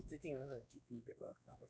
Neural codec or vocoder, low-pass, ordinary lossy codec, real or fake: codec, 16 kHz, 2 kbps, X-Codec, WavLM features, trained on Multilingual LibriSpeech; none; none; fake